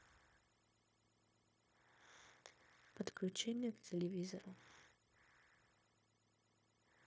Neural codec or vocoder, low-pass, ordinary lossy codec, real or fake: codec, 16 kHz, 0.9 kbps, LongCat-Audio-Codec; none; none; fake